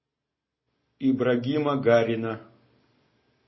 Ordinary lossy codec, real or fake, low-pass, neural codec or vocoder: MP3, 24 kbps; real; 7.2 kHz; none